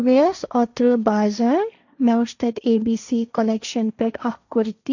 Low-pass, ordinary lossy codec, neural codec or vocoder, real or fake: 7.2 kHz; none; codec, 16 kHz, 1.1 kbps, Voila-Tokenizer; fake